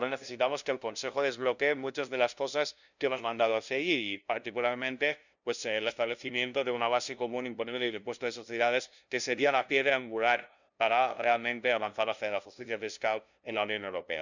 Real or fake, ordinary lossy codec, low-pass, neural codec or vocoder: fake; none; 7.2 kHz; codec, 16 kHz, 0.5 kbps, FunCodec, trained on LibriTTS, 25 frames a second